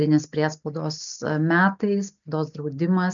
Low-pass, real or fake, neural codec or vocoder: 7.2 kHz; real; none